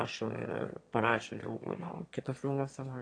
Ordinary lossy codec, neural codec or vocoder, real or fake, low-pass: AAC, 48 kbps; autoencoder, 22.05 kHz, a latent of 192 numbers a frame, VITS, trained on one speaker; fake; 9.9 kHz